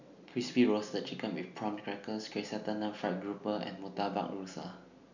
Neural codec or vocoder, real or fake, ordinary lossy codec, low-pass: none; real; none; 7.2 kHz